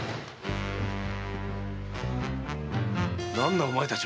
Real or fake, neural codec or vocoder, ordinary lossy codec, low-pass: real; none; none; none